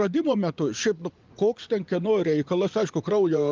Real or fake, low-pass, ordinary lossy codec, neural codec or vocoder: fake; 7.2 kHz; Opus, 32 kbps; vocoder, 24 kHz, 100 mel bands, Vocos